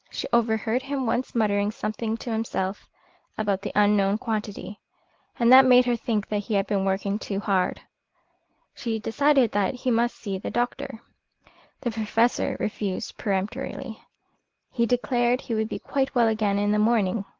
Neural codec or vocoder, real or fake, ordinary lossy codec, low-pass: none; real; Opus, 16 kbps; 7.2 kHz